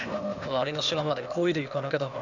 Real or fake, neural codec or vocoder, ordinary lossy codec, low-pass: fake; codec, 16 kHz, 0.8 kbps, ZipCodec; none; 7.2 kHz